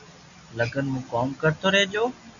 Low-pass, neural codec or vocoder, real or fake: 7.2 kHz; none; real